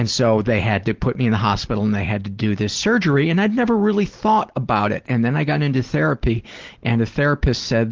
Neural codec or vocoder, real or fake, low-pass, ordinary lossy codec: none; real; 7.2 kHz; Opus, 16 kbps